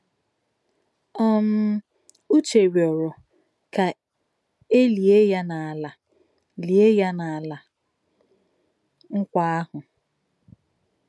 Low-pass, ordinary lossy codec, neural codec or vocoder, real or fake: none; none; none; real